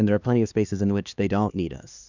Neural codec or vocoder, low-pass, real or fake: codec, 16 kHz, 2 kbps, X-Codec, HuBERT features, trained on LibriSpeech; 7.2 kHz; fake